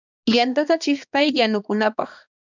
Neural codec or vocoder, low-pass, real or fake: codec, 16 kHz, 2 kbps, X-Codec, HuBERT features, trained on balanced general audio; 7.2 kHz; fake